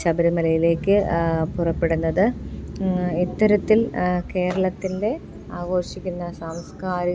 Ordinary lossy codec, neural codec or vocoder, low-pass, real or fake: none; none; none; real